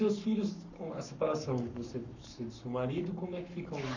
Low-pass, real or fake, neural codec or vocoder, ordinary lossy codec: 7.2 kHz; fake; codec, 44.1 kHz, 7.8 kbps, Pupu-Codec; none